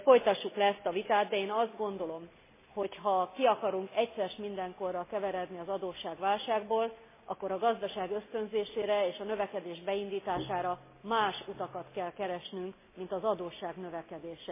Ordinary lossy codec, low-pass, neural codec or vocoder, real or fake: MP3, 16 kbps; 3.6 kHz; none; real